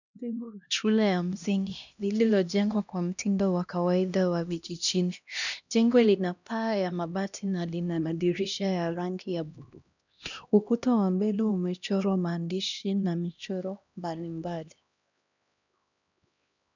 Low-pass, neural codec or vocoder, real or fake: 7.2 kHz; codec, 16 kHz, 1 kbps, X-Codec, HuBERT features, trained on LibriSpeech; fake